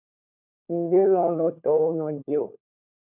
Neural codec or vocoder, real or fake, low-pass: codec, 16 kHz, 4 kbps, FunCodec, trained on LibriTTS, 50 frames a second; fake; 3.6 kHz